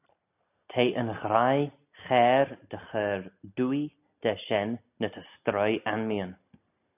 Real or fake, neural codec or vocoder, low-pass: real; none; 3.6 kHz